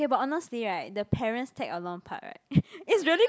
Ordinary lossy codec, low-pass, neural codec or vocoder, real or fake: none; none; none; real